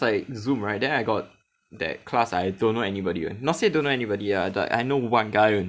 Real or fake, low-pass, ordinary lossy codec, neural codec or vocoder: real; none; none; none